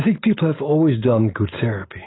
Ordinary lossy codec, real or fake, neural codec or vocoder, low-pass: AAC, 16 kbps; real; none; 7.2 kHz